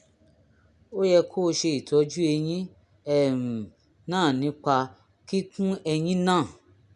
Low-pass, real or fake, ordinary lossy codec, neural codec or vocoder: 10.8 kHz; real; none; none